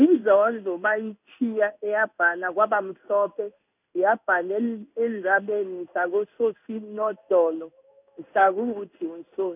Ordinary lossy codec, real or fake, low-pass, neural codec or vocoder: none; fake; 3.6 kHz; codec, 16 kHz in and 24 kHz out, 1 kbps, XY-Tokenizer